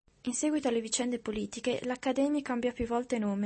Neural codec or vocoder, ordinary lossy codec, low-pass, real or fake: none; MP3, 32 kbps; 10.8 kHz; real